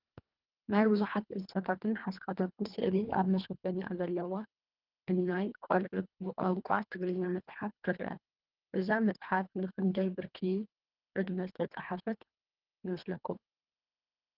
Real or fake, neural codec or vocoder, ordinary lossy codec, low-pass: fake; codec, 24 kHz, 1.5 kbps, HILCodec; Opus, 32 kbps; 5.4 kHz